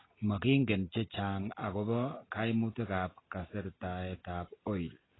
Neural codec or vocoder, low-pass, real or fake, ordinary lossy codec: none; 7.2 kHz; real; AAC, 16 kbps